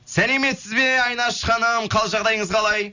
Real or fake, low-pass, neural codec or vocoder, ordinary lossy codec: real; 7.2 kHz; none; none